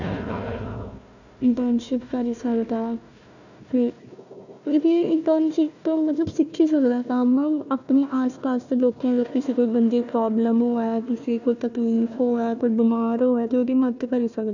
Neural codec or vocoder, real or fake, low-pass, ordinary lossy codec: codec, 16 kHz, 1 kbps, FunCodec, trained on Chinese and English, 50 frames a second; fake; 7.2 kHz; none